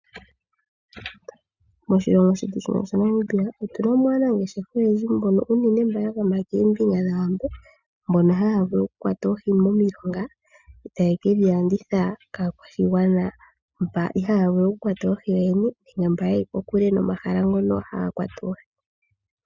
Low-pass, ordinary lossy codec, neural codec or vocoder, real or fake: 7.2 kHz; Opus, 64 kbps; none; real